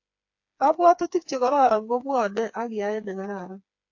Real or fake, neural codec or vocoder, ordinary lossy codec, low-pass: fake; codec, 16 kHz, 4 kbps, FreqCodec, smaller model; AAC, 48 kbps; 7.2 kHz